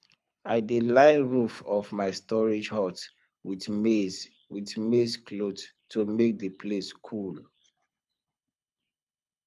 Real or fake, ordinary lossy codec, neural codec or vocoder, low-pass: fake; none; codec, 24 kHz, 6 kbps, HILCodec; none